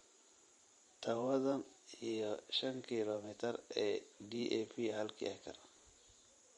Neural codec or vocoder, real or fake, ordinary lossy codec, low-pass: vocoder, 44.1 kHz, 128 mel bands every 512 samples, BigVGAN v2; fake; MP3, 48 kbps; 19.8 kHz